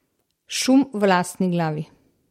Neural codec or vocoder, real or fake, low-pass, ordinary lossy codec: none; real; 19.8 kHz; MP3, 64 kbps